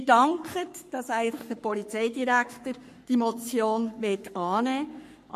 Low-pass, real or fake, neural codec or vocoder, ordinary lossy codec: 14.4 kHz; fake; codec, 44.1 kHz, 3.4 kbps, Pupu-Codec; MP3, 64 kbps